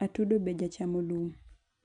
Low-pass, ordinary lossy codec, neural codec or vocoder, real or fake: 9.9 kHz; none; none; real